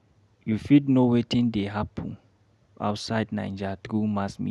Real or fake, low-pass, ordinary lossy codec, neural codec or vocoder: real; none; none; none